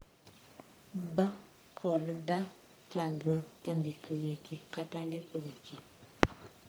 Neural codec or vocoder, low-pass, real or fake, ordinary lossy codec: codec, 44.1 kHz, 1.7 kbps, Pupu-Codec; none; fake; none